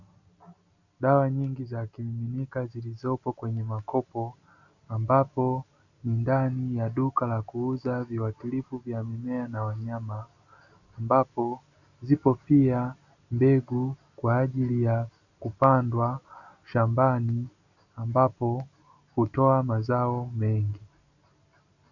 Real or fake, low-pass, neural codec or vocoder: real; 7.2 kHz; none